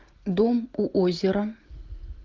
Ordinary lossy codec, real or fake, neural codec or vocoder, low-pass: Opus, 24 kbps; real; none; 7.2 kHz